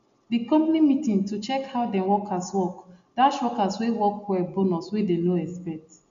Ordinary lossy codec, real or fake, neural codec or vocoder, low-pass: MP3, 64 kbps; real; none; 7.2 kHz